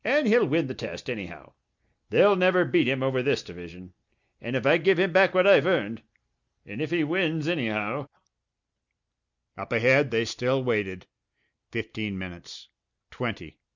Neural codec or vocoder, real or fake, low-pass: none; real; 7.2 kHz